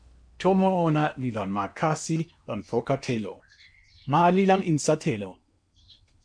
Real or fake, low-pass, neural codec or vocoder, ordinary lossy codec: fake; 9.9 kHz; codec, 16 kHz in and 24 kHz out, 0.8 kbps, FocalCodec, streaming, 65536 codes; MP3, 64 kbps